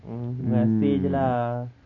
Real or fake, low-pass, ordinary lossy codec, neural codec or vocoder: real; 7.2 kHz; none; none